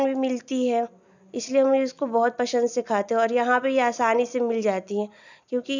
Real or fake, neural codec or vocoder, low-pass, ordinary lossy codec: real; none; 7.2 kHz; none